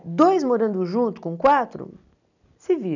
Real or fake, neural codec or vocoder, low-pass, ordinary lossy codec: real; none; 7.2 kHz; none